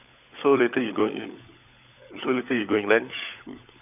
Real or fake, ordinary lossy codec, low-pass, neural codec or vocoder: fake; none; 3.6 kHz; codec, 16 kHz, 16 kbps, FunCodec, trained on LibriTTS, 50 frames a second